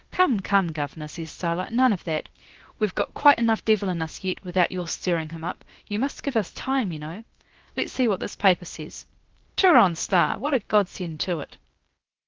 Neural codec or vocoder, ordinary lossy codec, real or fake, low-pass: codec, 16 kHz, about 1 kbps, DyCAST, with the encoder's durations; Opus, 16 kbps; fake; 7.2 kHz